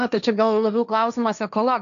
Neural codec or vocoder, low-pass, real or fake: codec, 16 kHz, 1.1 kbps, Voila-Tokenizer; 7.2 kHz; fake